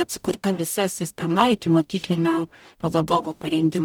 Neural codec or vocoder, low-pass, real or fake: codec, 44.1 kHz, 0.9 kbps, DAC; 19.8 kHz; fake